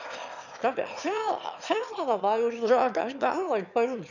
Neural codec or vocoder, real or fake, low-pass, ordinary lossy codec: autoencoder, 22.05 kHz, a latent of 192 numbers a frame, VITS, trained on one speaker; fake; 7.2 kHz; none